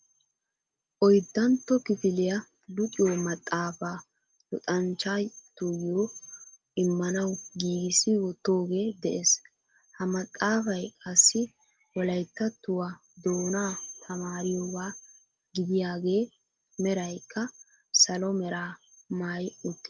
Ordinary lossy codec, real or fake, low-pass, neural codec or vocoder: Opus, 24 kbps; real; 7.2 kHz; none